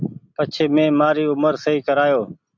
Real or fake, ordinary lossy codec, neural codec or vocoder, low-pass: real; MP3, 64 kbps; none; 7.2 kHz